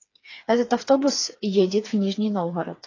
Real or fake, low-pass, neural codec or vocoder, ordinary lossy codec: fake; 7.2 kHz; codec, 16 kHz, 4 kbps, FreqCodec, smaller model; AAC, 32 kbps